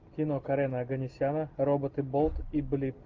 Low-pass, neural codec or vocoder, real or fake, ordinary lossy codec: 7.2 kHz; none; real; Opus, 32 kbps